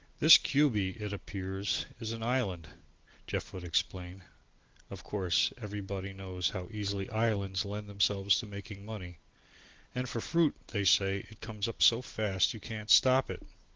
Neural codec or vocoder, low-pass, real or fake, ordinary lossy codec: none; 7.2 kHz; real; Opus, 16 kbps